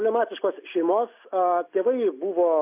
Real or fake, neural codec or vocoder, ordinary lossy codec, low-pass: real; none; AAC, 32 kbps; 3.6 kHz